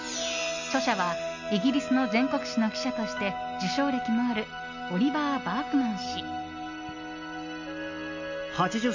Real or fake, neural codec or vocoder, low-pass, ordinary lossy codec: real; none; 7.2 kHz; none